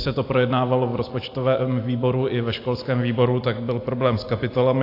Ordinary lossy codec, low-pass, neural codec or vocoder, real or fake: AAC, 32 kbps; 5.4 kHz; none; real